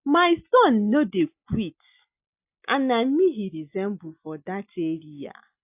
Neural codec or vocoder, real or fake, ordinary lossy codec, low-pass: none; real; AAC, 32 kbps; 3.6 kHz